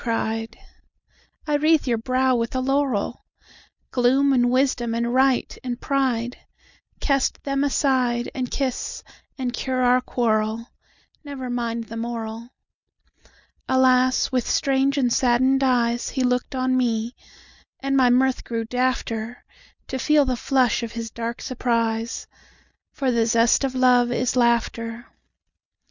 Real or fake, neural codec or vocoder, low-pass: real; none; 7.2 kHz